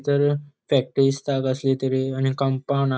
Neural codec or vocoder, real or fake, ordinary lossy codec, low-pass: none; real; none; none